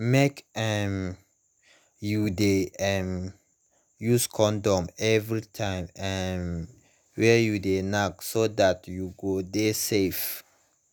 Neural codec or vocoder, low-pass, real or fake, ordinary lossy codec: none; none; real; none